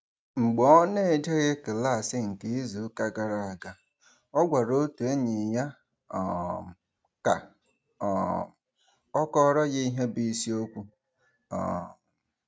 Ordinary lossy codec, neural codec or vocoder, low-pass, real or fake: none; none; none; real